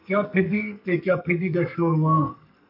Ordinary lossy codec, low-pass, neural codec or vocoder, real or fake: MP3, 48 kbps; 5.4 kHz; codec, 44.1 kHz, 2.6 kbps, SNAC; fake